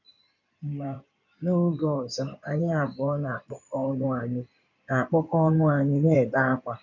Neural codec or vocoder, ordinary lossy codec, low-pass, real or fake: codec, 16 kHz in and 24 kHz out, 2.2 kbps, FireRedTTS-2 codec; none; 7.2 kHz; fake